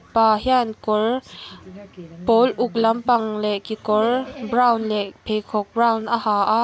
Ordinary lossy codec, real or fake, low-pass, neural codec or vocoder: none; real; none; none